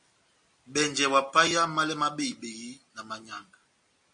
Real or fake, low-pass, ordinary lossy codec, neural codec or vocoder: real; 9.9 kHz; MP3, 48 kbps; none